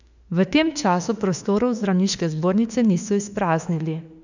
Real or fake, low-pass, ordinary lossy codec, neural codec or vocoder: fake; 7.2 kHz; none; autoencoder, 48 kHz, 32 numbers a frame, DAC-VAE, trained on Japanese speech